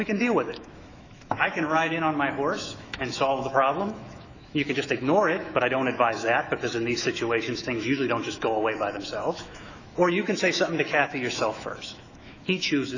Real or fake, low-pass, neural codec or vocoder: fake; 7.2 kHz; autoencoder, 48 kHz, 128 numbers a frame, DAC-VAE, trained on Japanese speech